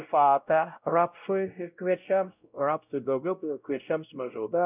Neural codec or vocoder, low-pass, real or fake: codec, 16 kHz, 0.5 kbps, X-Codec, WavLM features, trained on Multilingual LibriSpeech; 3.6 kHz; fake